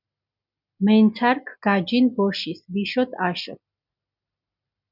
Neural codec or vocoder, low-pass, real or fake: none; 5.4 kHz; real